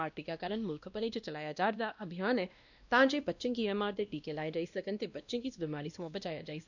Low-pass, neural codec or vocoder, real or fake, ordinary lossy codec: 7.2 kHz; codec, 16 kHz, 1 kbps, X-Codec, WavLM features, trained on Multilingual LibriSpeech; fake; none